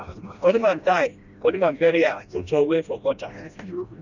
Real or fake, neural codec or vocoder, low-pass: fake; codec, 16 kHz, 1 kbps, FreqCodec, smaller model; 7.2 kHz